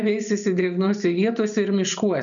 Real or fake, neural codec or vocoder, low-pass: real; none; 7.2 kHz